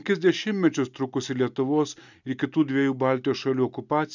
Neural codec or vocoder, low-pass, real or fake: none; 7.2 kHz; real